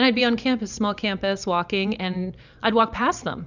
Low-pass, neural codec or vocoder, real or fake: 7.2 kHz; vocoder, 44.1 kHz, 128 mel bands every 512 samples, BigVGAN v2; fake